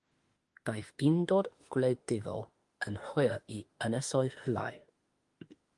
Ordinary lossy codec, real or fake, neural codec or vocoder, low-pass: Opus, 24 kbps; fake; autoencoder, 48 kHz, 32 numbers a frame, DAC-VAE, trained on Japanese speech; 10.8 kHz